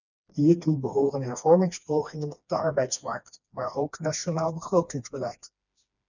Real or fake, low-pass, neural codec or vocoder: fake; 7.2 kHz; codec, 16 kHz, 2 kbps, FreqCodec, smaller model